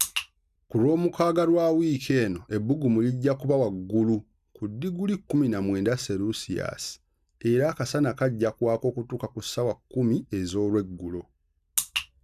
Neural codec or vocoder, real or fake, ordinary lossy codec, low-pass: none; real; none; 14.4 kHz